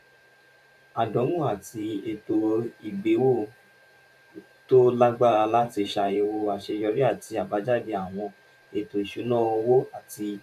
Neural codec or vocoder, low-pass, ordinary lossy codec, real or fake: vocoder, 44.1 kHz, 128 mel bands every 512 samples, BigVGAN v2; 14.4 kHz; none; fake